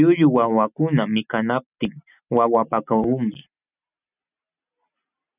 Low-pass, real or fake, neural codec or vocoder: 3.6 kHz; real; none